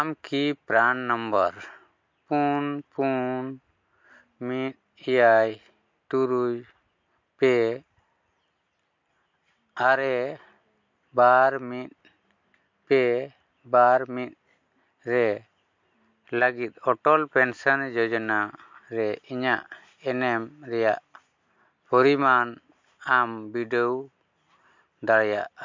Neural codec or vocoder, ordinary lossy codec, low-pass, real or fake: none; MP3, 48 kbps; 7.2 kHz; real